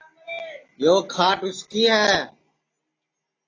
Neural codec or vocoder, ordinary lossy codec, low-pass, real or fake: none; AAC, 32 kbps; 7.2 kHz; real